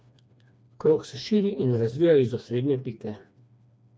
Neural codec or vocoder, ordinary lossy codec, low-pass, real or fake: codec, 16 kHz, 2 kbps, FreqCodec, smaller model; none; none; fake